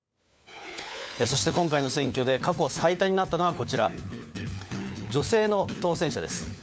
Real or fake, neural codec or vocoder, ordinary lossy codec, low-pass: fake; codec, 16 kHz, 4 kbps, FunCodec, trained on LibriTTS, 50 frames a second; none; none